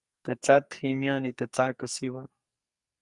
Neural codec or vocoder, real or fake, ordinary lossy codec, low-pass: codec, 44.1 kHz, 2.6 kbps, SNAC; fake; Opus, 64 kbps; 10.8 kHz